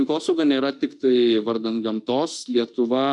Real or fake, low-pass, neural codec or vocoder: fake; 10.8 kHz; autoencoder, 48 kHz, 32 numbers a frame, DAC-VAE, trained on Japanese speech